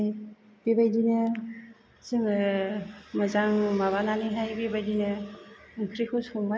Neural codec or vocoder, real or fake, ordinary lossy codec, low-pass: none; real; none; none